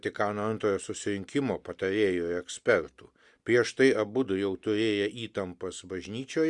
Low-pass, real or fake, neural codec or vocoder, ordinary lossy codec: 10.8 kHz; real; none; Opus, 64 kbps